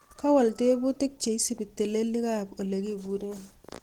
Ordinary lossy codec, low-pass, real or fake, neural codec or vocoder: Opus, 16 kbps; 19.8 kHz; real; none